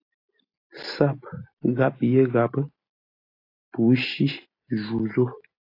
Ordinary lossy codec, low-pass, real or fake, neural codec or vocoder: AAC, 32 kbps; 5.4 kHz; real; none